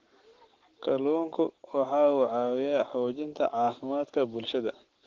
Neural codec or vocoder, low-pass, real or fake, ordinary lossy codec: codec, 44.1 kHz, 7.8 kbps, Pupu-Codec; 7.2 kHz; fake; Opus, 16 kbps